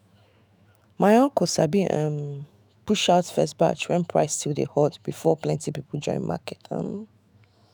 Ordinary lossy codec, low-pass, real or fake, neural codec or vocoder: none; none; fake; autoencoder, 48 kHz, 128 numbers a frame, DAC-VAE, trained on Japanese speech